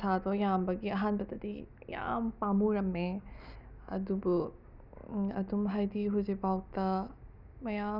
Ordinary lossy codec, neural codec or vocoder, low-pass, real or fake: none; none; 5.4 kHz; real